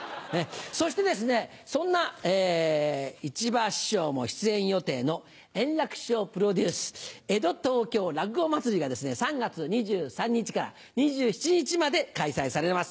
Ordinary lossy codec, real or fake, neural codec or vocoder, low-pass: none; real; none; none